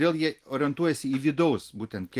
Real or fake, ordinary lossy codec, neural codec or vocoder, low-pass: real; Opus, 24 kbps; none; 14.4 kHz